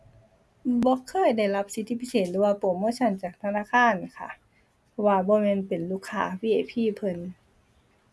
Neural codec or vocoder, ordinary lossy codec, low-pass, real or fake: none; none; none; real